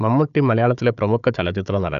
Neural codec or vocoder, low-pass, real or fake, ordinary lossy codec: codec, 16 kHz, 4 kbps, FunCodec, trained on Chinese and English, 50 frames a second; 7.2 kHz; fake; none